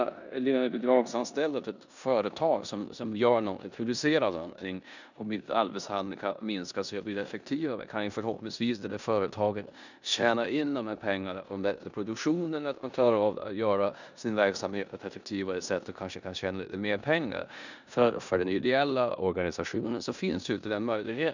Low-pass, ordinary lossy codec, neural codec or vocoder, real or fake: 7.2 kHz; none; codec, 16 kHz in and 24 kHz out, 0.9 kbps, LongCat-Audio-Codec, four codebook decoder; fake